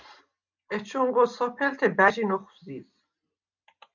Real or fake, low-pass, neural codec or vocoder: real; 7.2 kHz; none